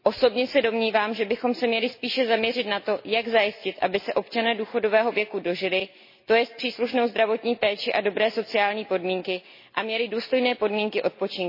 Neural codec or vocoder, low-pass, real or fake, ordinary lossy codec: none; 5.4 kHz; real; MP3, 24 kbps